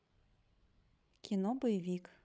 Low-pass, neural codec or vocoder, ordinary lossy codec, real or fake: none; codec, 16 kHz, 16 kbps, FreqCodec, larger model; none; fake